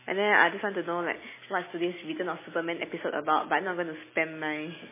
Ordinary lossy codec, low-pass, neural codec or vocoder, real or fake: MP3, 16 kbps; 3.6 kHz; none; real